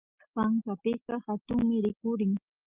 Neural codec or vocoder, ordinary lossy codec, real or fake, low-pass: none; Opus, 24 kbps; real; 3.6 kHz